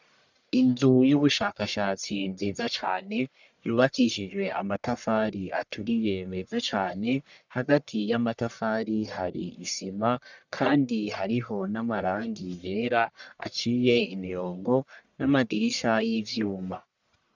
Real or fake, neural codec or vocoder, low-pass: fake; codec, 44.1 kHz, 1.7 kbps, Pupu-Codec; 7.2 kHz